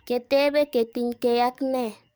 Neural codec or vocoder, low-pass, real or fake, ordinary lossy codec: codec, 44.1 kHz, 7.8 kbps, DAC; none; fake; none